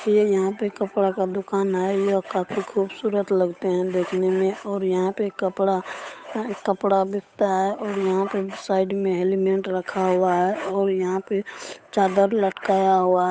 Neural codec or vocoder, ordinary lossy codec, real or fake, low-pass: codec, 16 kHz, 8 kbps, FunCodec, trained on Chinese and English, 25 frames a second; none; fake; none